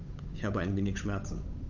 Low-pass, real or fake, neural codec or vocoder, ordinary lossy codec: 7.2 kHz; fake; codec, 16 kHz, 8 kbps, FunCodec, trained on Chinese and English, 25 frames a second; none